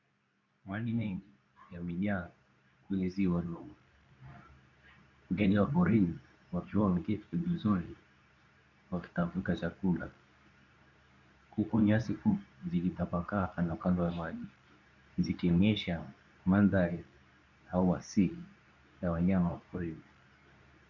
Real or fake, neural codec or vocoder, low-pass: fake; codec, 24 kHz, 0.9 kbps, WavTokenizer, medium speech release version 2; 7.2 kHz